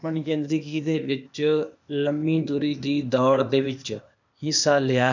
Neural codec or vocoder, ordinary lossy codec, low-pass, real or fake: codec, 16 kHz, 0.8 kbps, ZipCodec; none; 7.2 kHz; fake